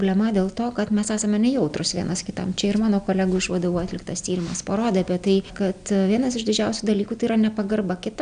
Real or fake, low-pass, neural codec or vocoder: real; 9.9 kHz; none